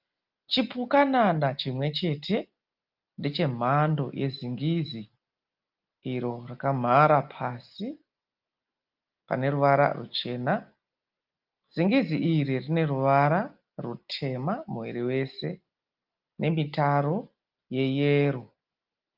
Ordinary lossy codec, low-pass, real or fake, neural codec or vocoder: Opus, 24 kbps; 5.4 kHz; real; none